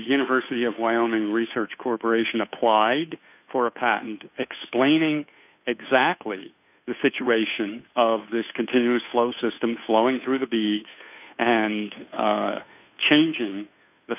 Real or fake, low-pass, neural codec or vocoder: fake; 3.6 kHz; codec, 16 kHz, 2 kbps, FunCodec, trained on Chinese and English, 25 frames a second